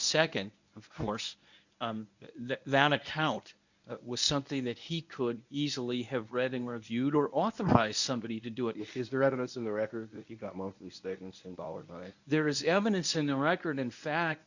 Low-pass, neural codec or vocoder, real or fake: 7.2 kHz; codec, 24 kHz, 0.9 kbps, WavTokenizer, medium speech release version 1; fake